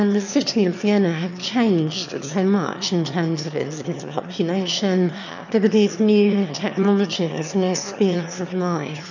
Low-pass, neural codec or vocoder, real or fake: 7.2 kHz; autoencoder, 22.05 kHz, a latent of 192 numbers a frame, VITS, trained on one speaker; fake